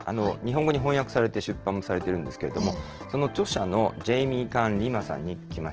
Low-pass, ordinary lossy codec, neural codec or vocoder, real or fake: 7.2 kHz; Opus, 16 kbps; none; real